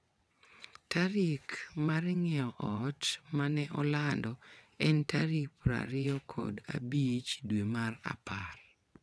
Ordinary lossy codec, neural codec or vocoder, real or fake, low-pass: none; vocoder, 22.05 kHz, 80 mel bands, WaveNeXt; fake; none